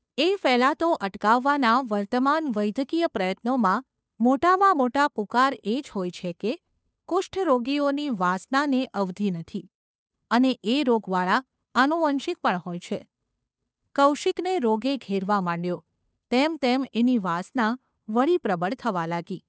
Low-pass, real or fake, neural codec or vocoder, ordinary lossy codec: none; fake; codec, 16 kHz, 2 kbps, FunCodec, trained on Chinese and English, 25 frames a second; none